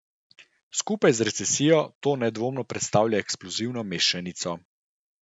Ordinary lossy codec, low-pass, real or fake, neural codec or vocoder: none; 9.9 kHz; real; none